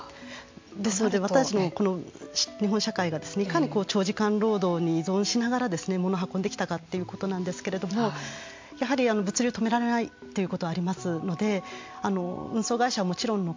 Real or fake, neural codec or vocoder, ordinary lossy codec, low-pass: real; none; MP3, 48 kbps; 7.2 kHz